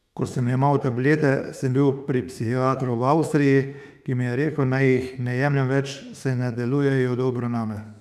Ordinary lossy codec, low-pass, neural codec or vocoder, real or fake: none; 14.4 kHz; autoencoder, 48 kHz, 32 numbers a frame, DAC-VAE, trained on Japanese speech; fake